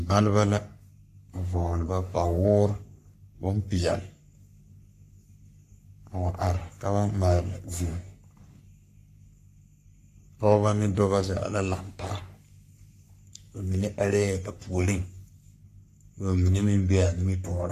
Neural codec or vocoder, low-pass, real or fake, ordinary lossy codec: codec, 44.1 kHz, 3.4 kbps, Pupu-Codec; 14.4 kHz; fake; AAC, 64 kbps